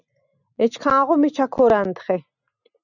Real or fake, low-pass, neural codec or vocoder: real; 7.2 kHz; none